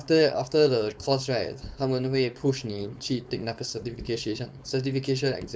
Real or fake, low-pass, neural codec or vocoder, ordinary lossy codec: fake; none; codec, 16 kHz, 4.8 kbps, FACodec; none